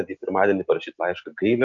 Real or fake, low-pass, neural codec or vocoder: real; 7.2 kHz; none